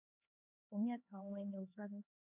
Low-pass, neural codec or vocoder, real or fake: 3.6 kHz; codec, 16 kHz, 2 kbps, X-Codec, HuBERT features, trained on LibriSpeech; fake